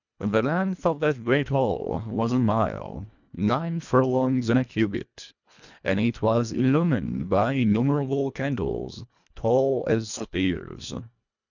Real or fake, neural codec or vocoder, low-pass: fake; codec, 24 kHz, 1.5 kbps, HILCodec; 7.2 kHz